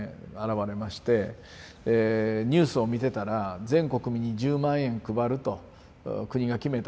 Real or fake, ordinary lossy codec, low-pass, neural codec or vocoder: real; none; none; none